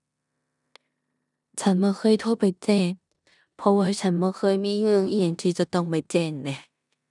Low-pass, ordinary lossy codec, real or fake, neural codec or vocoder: 10.8 kHz; none; fake; codec, 16 kHz in and 24 kHz out, 0.9 kbps, LongCat-Audio-Codec, four codebook decoder